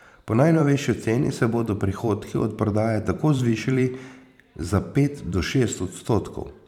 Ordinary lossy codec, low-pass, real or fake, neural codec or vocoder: none; 19.8 kHz; fake; vocoder, 44.1 kHz, 128 mel bands every 512 samples, BigVGAN v2